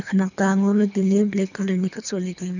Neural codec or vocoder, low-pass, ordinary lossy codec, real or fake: codec, 24 kHz, 3 kbps, HILCodec; 7.2 kHz; none; fake